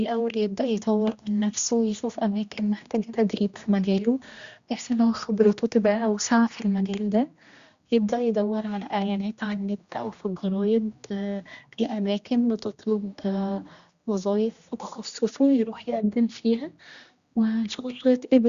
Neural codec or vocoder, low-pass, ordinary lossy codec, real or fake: codec, 16 kHz, 1 kbps, X-Codec, HuBERT features, trained on general audio; 7.2 kHz; Opus, 64 kbps; fake